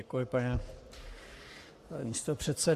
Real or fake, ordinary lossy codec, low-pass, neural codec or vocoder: fake; Opus, 64 kbps; 14.4 kHz; codec, 44.1 kHz, 7.8 kbps, Pupu-Codec